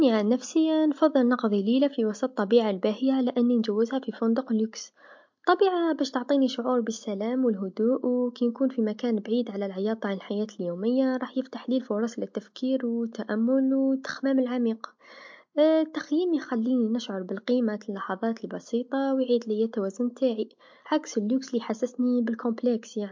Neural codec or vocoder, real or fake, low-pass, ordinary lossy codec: none; real; 7.2 kHz; MP3, 48 kbps